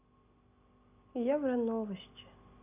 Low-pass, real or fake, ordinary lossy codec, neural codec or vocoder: 3.6 kHz; real; none; none